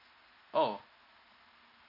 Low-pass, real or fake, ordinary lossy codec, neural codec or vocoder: 5.4 kHz; real; none; none